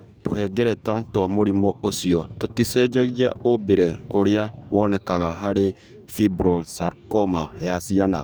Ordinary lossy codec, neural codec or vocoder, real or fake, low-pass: none; codec, 44.1 kHz, 2.6 kbps, DAC; fake; none